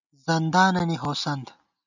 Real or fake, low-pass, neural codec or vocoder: real; 7.2 kHz; none